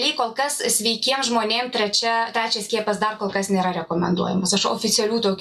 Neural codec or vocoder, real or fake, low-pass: none; real; 14.4 kHz